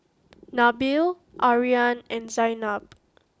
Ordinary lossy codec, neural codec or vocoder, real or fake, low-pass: none; none; real; none